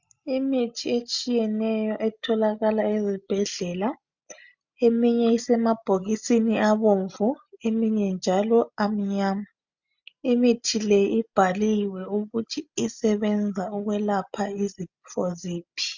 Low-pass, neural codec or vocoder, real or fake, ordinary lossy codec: 7.2 kHz; none; real; MP3, 64 kbps